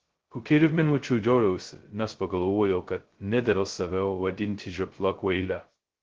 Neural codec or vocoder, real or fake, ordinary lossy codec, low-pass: codec, 16 kHz, 0.2 kbps, FocalCodec; fake; Opus, 16 kbps; 7.2 kHz